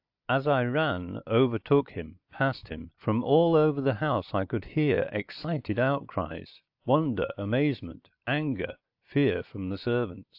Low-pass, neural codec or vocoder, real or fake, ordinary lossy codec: 5.4 kHz; none; real; AAC, 48 kbps